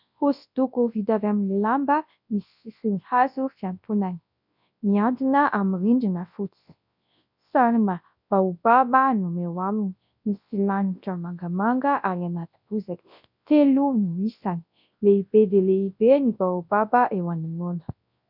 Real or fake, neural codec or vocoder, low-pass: fake; codec, 24 kHz, 0.9 kbps, WavTokenizer, large speech release; 5.4 kHz